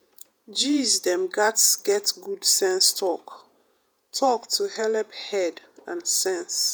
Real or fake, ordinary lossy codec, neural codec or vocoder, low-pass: fake; none; vocoder, 48 kHz, 128 mel bands, Vocos; none